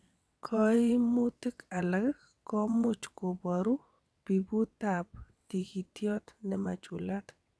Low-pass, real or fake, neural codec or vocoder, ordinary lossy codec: none; fake; vocoder, 22.05 kHz, 80 mel bands, WaveNeXt; none